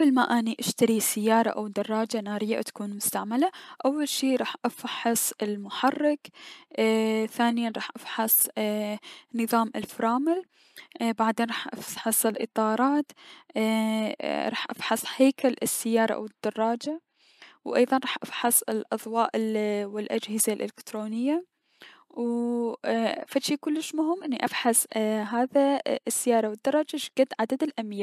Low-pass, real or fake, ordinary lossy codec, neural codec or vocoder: 14.4 kHz; real; MP3, 96 kbps; none